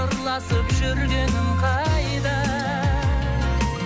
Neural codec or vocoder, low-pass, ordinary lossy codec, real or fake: none; none; none; real